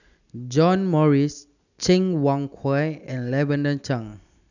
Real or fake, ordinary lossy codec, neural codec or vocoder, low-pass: real; none; none; 7.2 kHz